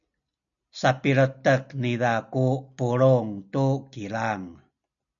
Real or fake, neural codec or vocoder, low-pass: real; none; 7.2 kHz